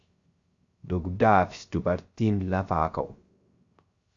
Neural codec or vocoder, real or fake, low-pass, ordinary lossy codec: codec, 16 kHz, 0.3 kbps, FocalCodec; fake; 7.2 kHz; Opus, 64 kbps